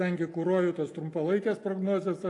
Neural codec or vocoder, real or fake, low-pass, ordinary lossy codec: none; real; 10.8 kHz; AAC, 48 kbps